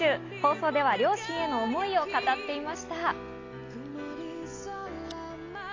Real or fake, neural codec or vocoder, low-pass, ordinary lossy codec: real; none; 7.2 kHz; AAC, 32 kbps